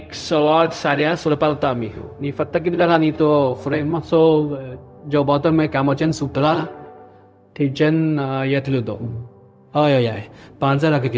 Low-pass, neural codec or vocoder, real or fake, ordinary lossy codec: none; codec, 16 kHz, 0.4 kbps, LongCat-Audio-Codec; fake; none